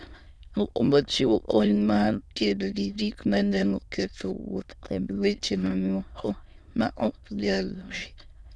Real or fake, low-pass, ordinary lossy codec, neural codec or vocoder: fake; none; none; autoencoder, 22.05 kHz, a latent of 192 numbers a frame, VITS, trained on many speakers